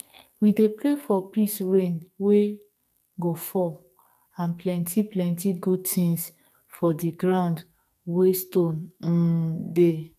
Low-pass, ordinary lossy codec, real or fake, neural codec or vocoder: 14.4 kHz; none; fake; codec, 44.1 kHz, 2.6 kbps, SNAC